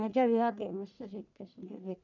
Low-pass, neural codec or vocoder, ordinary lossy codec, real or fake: 7.2 kHz; codec, 44.1 kHz, 1.7 kbps, Pupu-Codec; none; fake